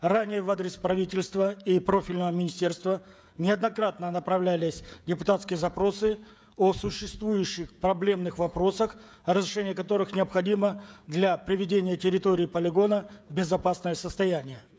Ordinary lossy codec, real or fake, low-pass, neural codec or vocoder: none; fake; none; codec, 16 kHz, 16 kbps, FreqCodec, smaller model